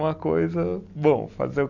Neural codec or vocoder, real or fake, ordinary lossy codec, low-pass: none; real; none; 7.2 kHz